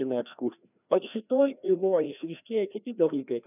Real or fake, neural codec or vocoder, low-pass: fake; codec, 16 kHz, 1 kbps, FreqCodec, larger model; 3.6 kHz